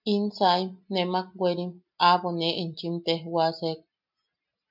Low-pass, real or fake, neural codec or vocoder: 5.4 kHz; real; none